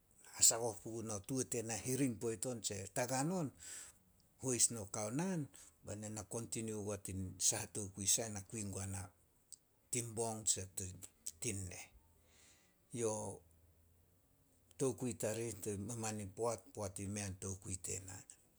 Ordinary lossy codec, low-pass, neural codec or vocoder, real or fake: none; none; none; real